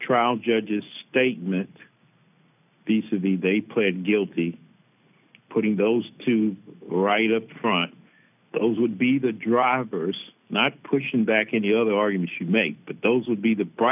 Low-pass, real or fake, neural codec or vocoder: 3.6 kHz; real; none